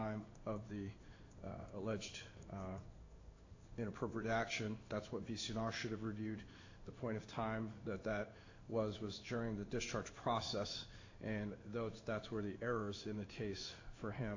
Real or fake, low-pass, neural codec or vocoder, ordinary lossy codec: fake; 7.2 kHz; codec, 16 kHz in and 24 kHz out, 1 kbps, XY-Tokenizer; AAC, 48 kbps